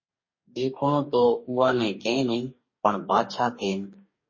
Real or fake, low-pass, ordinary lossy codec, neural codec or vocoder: fake; 7.2 kHz; MP3, 32 kbps; codec, 44.1 kHz, 2.6 kbps, DAC